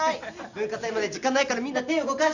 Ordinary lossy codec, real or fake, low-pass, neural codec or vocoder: none; real; 7.2 kHz; none